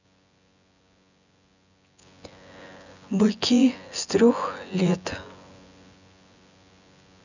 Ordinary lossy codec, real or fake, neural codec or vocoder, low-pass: none; fake; vocoder, 24 kHz, 100 mel bands, Vocos; 7.2 kHz